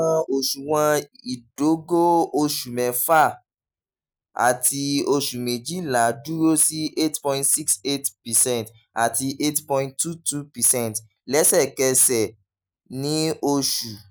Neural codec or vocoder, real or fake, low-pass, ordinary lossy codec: none; real; none; none